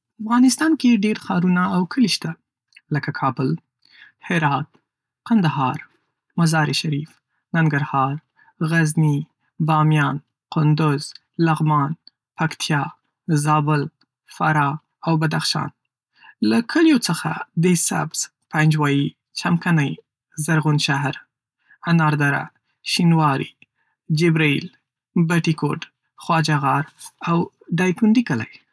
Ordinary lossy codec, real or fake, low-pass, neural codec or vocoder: none; real; none; none